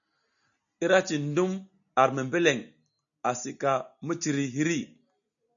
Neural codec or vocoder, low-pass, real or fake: none; 7.2 kHz; real